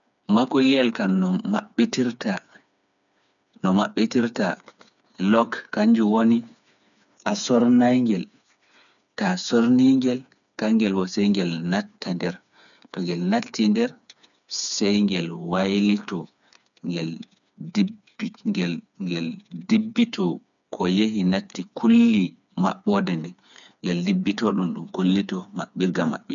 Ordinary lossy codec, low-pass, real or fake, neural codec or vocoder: none; 7.2 kHz; fake; codec, 16 kHz, 4 kbps, FreqCodec, smaller model